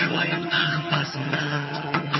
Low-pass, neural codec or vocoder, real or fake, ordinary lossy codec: 7.2 kHz; vocoder, 22.05 kHz, 80 mel bands, HiFi-GAN; fake; MP3, 24 kbps